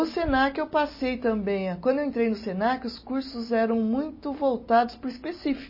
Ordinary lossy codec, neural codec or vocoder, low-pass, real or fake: MP3, 24 kbps; none; 5.4 kHz; real